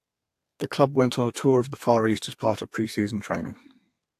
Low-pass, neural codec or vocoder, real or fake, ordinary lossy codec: 14.4 kHz; codec, 32 kHz, 1.9 kbps, SNAC; fake; AAC, 64 kbps